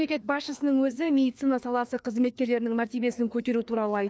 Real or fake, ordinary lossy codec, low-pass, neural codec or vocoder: fake; none; none; codec, 16 kHz, 2 kbps, FreqCodec, larger model